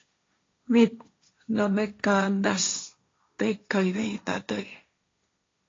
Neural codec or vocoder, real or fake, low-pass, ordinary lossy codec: codec, 16 kHz, 1.1 kbps, Voila-Tokenizer; fake; 7.2 kHz; AAC, 32 kbps